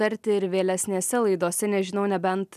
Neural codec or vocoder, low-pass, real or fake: none; 14.4 kHz; real